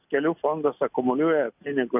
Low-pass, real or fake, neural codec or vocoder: 3.6 kHz; real; none